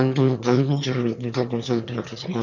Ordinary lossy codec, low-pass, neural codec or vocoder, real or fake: none; 7.2 kHz; autoencoder, 22.05 kHz, a latent of 192 numbers a frame, VITS, trained on one speaker; fake